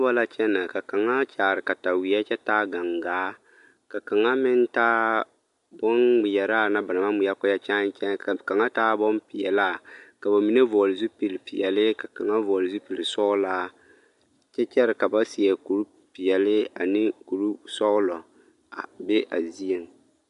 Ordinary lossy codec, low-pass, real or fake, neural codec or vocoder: MP3, 64 kbps; 10.8 kHz; real; none